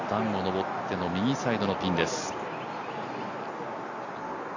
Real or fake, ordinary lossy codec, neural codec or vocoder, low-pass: real; none; none; 7.2 kHz